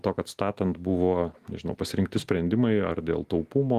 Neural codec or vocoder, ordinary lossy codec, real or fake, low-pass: none; Opus, 24 kbps; real; 14.4 kHz